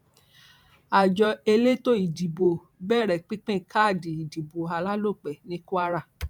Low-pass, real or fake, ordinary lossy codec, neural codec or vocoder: 19.8 kHz; fake; none; vocoder, 44.1 kHz, 128 mel bands every 512 samples, BigVGAN v2